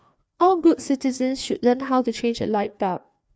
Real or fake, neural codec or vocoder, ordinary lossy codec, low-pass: fake; codec, 16 kHz, 2 kbps, FreqCodec, larger model; none; none